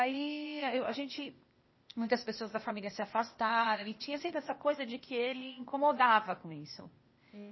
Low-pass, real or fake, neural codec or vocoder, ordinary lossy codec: 7.2 kHz; fake; codec, 16 kHz, 0.8 kbps, ZipCodec; MP3, 24 kbps